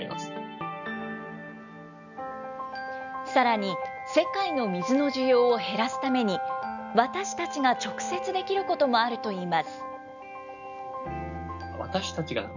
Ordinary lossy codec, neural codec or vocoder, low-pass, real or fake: none; none; 7.2 kHz; real